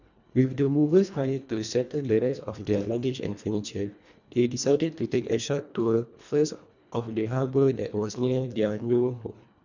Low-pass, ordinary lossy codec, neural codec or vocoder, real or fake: 7.2 kHz; none; codec, 24 kHz, 1.5 kbps, HILCodec; fake